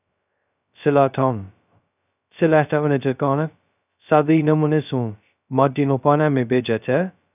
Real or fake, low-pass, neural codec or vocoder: fake; 3.6 kHz; codec, 16 kHz, 0.2 kbps, FocalCodec